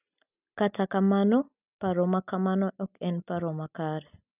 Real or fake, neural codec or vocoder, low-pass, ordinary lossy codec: real; none; 3.6 kHz; none